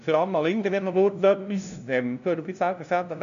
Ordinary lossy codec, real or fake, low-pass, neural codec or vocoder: AAC, 96 kbps; fake; 7.2 kHz; codec, 16 kHz, 0.5 kbps, FunCodec, trained on LibriTTS, 25 frames a second